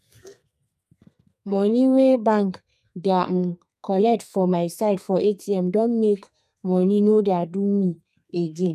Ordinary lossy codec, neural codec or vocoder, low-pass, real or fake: none; codec, 32 kHz, 1.9 kbps, SNAC; 14.4 kHz; fake